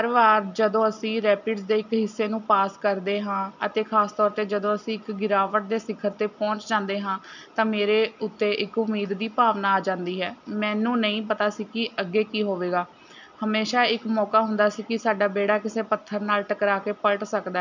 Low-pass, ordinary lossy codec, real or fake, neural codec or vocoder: 7.2 kHz; none; real; none